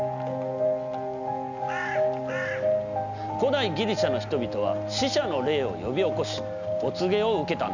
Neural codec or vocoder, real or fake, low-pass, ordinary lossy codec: none; real; 7.2 kHz; none